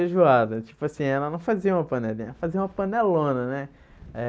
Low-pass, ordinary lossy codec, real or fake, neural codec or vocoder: none; none; real; none